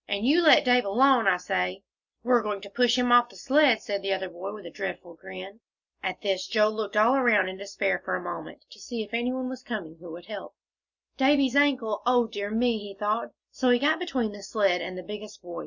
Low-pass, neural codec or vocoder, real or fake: 7.2 kHz; none; real